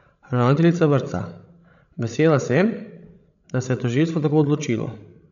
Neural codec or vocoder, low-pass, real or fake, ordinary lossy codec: codec, 16 kHz, 16 kbps, FreqCodec, larger model; 7.2 kHz; fake; none